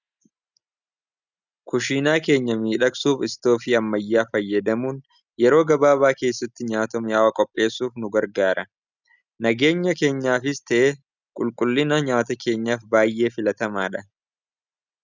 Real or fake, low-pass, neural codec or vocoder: real; 7.2 kHz; none